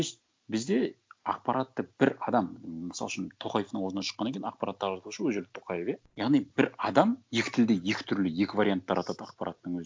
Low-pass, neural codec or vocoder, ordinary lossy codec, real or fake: none; none; none; real